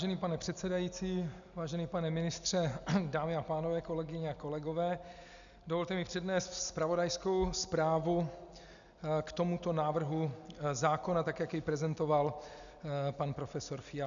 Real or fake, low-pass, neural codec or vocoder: real; 7.2 kHz; none